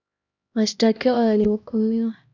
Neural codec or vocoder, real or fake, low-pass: codec, 16 kHz, 1 kbps, X-Codec, HuBERT features, trained on LibriSpeech; fake; 7.2 kHz